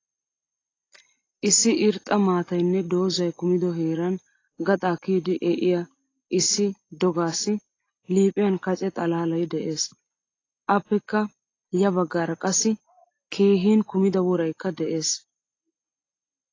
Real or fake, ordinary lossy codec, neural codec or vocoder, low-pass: real; AAC, 32 kbps; none; 7.2 kHz